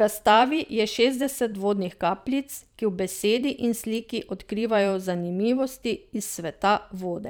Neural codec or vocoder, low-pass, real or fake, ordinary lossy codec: vocoder, 44.1 kHz, 128 mel bands every 512 samples, BigVGAN v2; none; fake; none